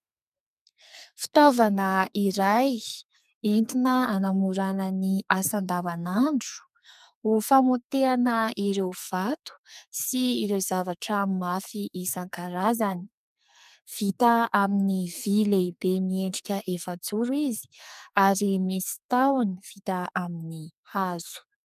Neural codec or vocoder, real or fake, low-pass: codec, 44.1 kHz, 2.6 kbps, SNAC; fake; 14.4 kHz